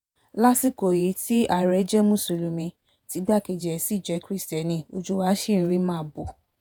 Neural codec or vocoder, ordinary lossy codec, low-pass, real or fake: vocoder, 48 kHz, 128 mel bands, Vocos; none; none; fake